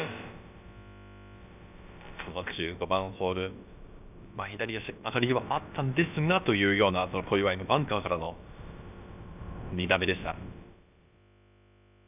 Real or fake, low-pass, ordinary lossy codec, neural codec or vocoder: fake; 3.6 kHz; none; codec, 16 kHz, about 1 kbps, DyCAST, with the encoder's durations